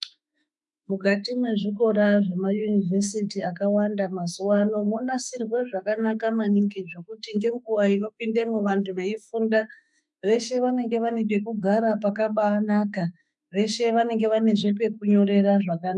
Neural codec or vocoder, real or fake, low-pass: autoencoder, 48 kHz, 32 numbers a frame, DAC-VAE, trained on Japanese speech; fake; 10.8 kHz